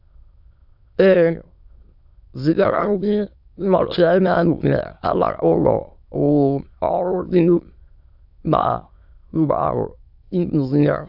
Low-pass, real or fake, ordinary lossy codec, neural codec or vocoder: 5.4 kHz; fake; AAC, 48 kbps; autoencoder, 22.05 kHz, a latent of 192 numbers a frame, VITS, trained on many speakers